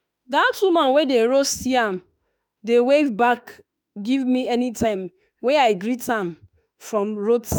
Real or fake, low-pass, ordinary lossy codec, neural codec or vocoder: fake; none; none; autoencoder, 48 kHz, 32 numbers a frame, DAC-VAE, trained on Japanese speech